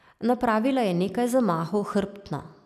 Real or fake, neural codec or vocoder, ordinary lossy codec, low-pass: real; none; none; 14.4 kHz